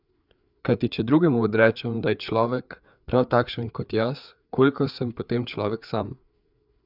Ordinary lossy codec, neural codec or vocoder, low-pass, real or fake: none; codec, 16 kHz, 4 kbps, FreqCodec, larger model; 5.4 kHz; fake